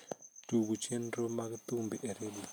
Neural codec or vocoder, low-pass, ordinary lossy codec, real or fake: none; none; none; real